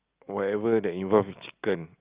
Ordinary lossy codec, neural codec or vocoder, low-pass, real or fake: Opus, 24 kbps; none; 3.6 kHz; real